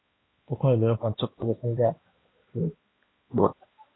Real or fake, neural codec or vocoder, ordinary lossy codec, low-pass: fake; codec, 16 kHz, 1 kbps, X-Codec, HuBERT features, trained on balanced general audio; AAC, 16 kbps; 7.2 kHz